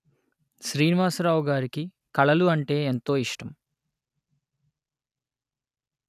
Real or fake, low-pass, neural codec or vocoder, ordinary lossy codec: real; 14.4 kHz; none; none